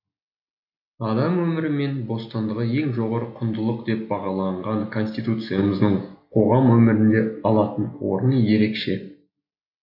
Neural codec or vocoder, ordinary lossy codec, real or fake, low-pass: none; AAC, 48 kbps; real; 5.4 kHz